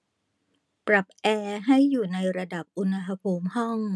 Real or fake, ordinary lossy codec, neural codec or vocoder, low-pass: real; none; none; none